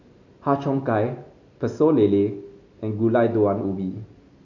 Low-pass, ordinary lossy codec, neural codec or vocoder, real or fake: 7.2 kHz; AAC, 48 kbps; none; real